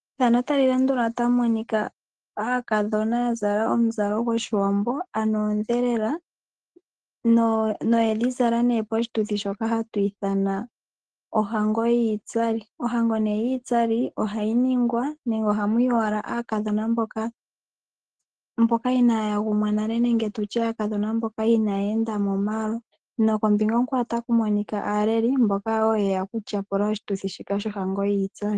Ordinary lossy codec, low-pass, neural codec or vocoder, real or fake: Opus, 16 kbps; 9.9 kHz; none; real